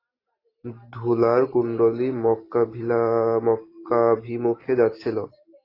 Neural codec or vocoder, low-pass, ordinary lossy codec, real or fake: none; 5.4 kHz; AAC, 24 kbps; real